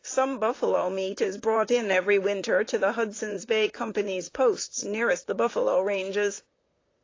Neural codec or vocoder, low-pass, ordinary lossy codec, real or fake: vocoder, 44.1 kHz, 128 mel bands, Pupu-Vocoder; 7.2 kHz; AAC, 32 kbps; fake